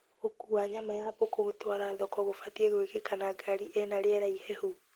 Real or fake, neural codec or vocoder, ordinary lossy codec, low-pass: real; none; Opus, 16 kbps; 19.8 kHz